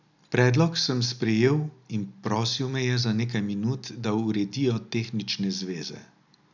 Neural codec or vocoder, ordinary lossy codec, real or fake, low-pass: none; none; real; 7.2 kHz